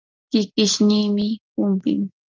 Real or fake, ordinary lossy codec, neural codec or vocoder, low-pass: real; Opus, 32 kbps; none; 7.2 kHz